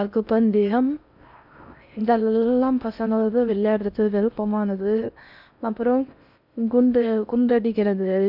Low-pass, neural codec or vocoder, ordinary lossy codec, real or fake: 5.4 kHz; codec, 16 kHz in and 24 kHz out, 0.6 kbps, FocalCodec, streaming, 4096 codes; none; fake